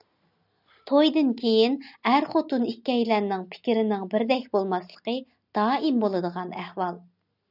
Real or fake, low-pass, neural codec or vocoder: real; 5.4 kHz; none